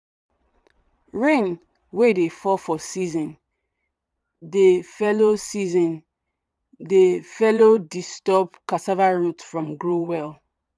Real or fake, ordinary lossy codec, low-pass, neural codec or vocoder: fake; none; none; vocoder, 22.05 kHz, 80 mel bands, Vocos